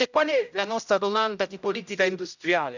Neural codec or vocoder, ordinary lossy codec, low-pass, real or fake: codec, 16 kHz, 0.5 kbps, X-Codec, HuBERT features, trained on general audio; none; 7.2 kHz; fake